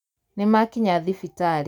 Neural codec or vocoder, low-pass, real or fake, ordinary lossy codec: none; 19.8 kHz; real; none